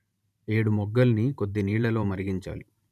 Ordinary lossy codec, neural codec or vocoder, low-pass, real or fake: none; vocoder, 44.1 kHz, 128 mel bands every 512 samples, BigVGAN v2; 14.4 kHz; fake